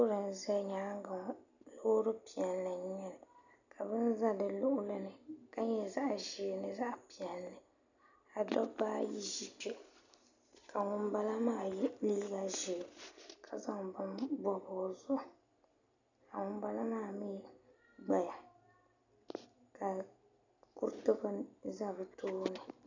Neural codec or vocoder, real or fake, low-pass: none; real; 7.2 kHz